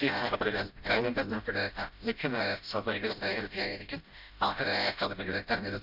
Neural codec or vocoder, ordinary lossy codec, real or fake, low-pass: codec, 16 kHz, 0.5 kbps, FreqCodec, smaller model; none; fake; 5.4 kHz